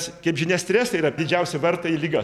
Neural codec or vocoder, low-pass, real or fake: vocoder, 44.1 kHz, 128 mel bands every 256 samples, BigVGAN v2; 19.8 kHz; fake